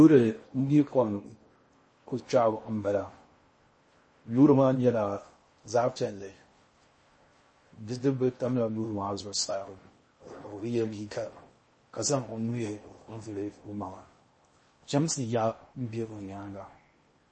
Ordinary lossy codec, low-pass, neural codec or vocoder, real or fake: MP3, 32 kbps; 9.9 kHz; codec, 16 kHz in and 24 kHz out, 0.6 kbps, FocalCodec, streaming, 4096 codes; fake